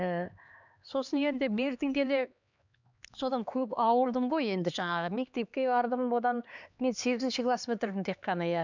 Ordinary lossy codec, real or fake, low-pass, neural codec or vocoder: none; fake; 7.2 kHz; codec, 16 kHz, 2 kbps, X-Codec, HuBERT features, trained on LibriSpeech